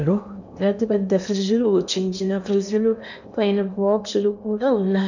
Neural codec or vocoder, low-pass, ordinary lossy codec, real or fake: codec, 16 kHz in and 24 kHz out, 0.8 kbps, FocalCodec, streaming, 65536 codes; 7.2 kHz; none; fake